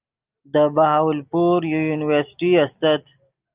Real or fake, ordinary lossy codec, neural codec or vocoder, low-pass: real; Opus, 24 kbps; none; 3.6 kHz